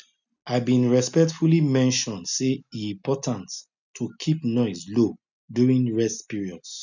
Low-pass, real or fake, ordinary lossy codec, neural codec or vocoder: 7.2 kHz; real; none; none